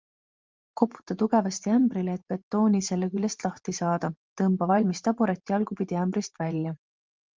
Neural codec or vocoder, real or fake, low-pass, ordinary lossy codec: vocoder, 44.1 kHz, 128 mel bands every 512 samples, BigVGAN v2; fake; 7.2 kHz; Opus, 32 kbps